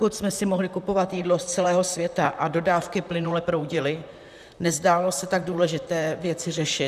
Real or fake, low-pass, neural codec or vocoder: fake; 14.4 kHz; vocoder, 44.1 kHz, 128 mel bands, Pupu-Vocoder